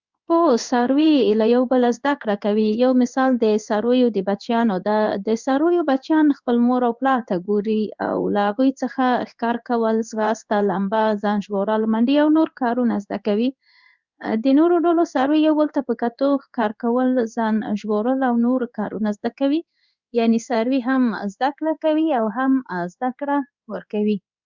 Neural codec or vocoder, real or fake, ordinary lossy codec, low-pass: codec, 16 kHz in and 24 kHz out, 1 kbps, XY-Tokenizer; fake; Opus, 64 kbps; 7.2 kHz